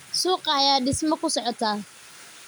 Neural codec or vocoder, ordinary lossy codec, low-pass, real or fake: none; none; none; real